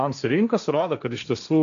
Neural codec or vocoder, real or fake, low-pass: codec, 16 kHz, 1.1 kbps, Voila-Tokenizer; fake; 7.2 kHz